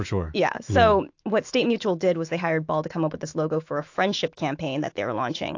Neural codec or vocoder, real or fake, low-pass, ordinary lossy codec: autoencoder, 48 kHz, 128 numbers a frame, DAC-VAE, trained on Japanese speech; fake; 7.2 kHz; AAC, 48 kbps